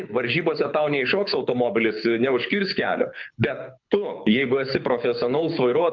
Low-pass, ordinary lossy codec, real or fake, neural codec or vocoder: 7.2 kHz; MP3, 64 kbps; fake; codec, 24 kHz, 6 kbps, HILCodec